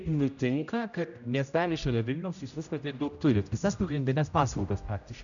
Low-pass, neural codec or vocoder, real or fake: 7.2 kHz; codec, 16 kHz, 0.5 kbps, X-Codec, HuBERT features, trained on general audio; fake